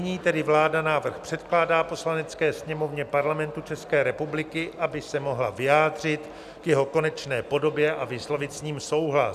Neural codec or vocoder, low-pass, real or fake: none; 14.4 kHz; real